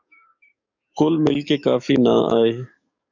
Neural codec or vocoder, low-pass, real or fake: codec, 44.1 kHz, 7.8 kbps, DAC; 7.2 kHz; fake